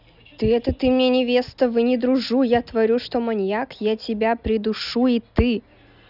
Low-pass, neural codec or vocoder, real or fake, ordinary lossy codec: 5.4 kHz; none; real; none